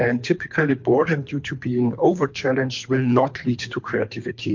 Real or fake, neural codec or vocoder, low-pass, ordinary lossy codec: fake; codec, 24 kHz, 3 kbps, HILCodec; 7.2 kHz; MP3, 48 kbps